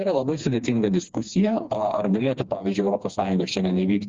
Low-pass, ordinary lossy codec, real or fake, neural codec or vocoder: 7.2 kHz; Opus, 32 kbps; fake; codec, 16 kHz, 2 kbps, FreqCodec, smaller model